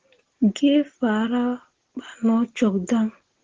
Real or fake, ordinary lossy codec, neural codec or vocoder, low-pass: real; Opus, 16 kbps; none; 7.2 kHz